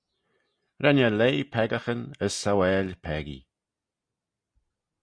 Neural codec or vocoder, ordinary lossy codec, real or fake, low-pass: none; Opus, 64 kbps; real; 9.9 kHz